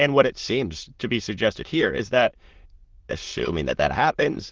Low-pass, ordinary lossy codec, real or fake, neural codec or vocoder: 7.2 kHz; Opus, 16 kbps; fake; autoencoder, 22.05 kHz, a latent of 192 numbers a frame, VITS, trained on many speakers